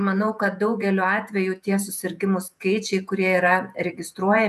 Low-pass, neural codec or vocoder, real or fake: 14.4 kHz; vocoder, 48 kHz, 128 mel bands, Vocos; fake